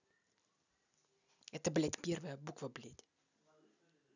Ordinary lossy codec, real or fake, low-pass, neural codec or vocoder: none; real; 7.2 kHz; none